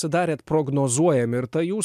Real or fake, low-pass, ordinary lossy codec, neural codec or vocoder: real; 14.4 kHz; MP3, 96 kbps; none